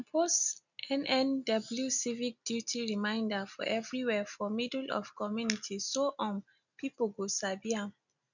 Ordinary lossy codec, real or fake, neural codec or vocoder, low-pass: none; real; none; 7.2 kHz